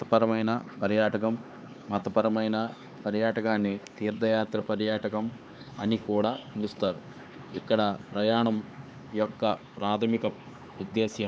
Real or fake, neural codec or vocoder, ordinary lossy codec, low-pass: fake; codec, 16 kHz, 4 kbps, X-Codec, HuBERT features, trained on LibriSpeech; none; none